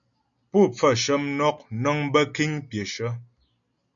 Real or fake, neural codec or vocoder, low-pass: real; none; 7.2 kHz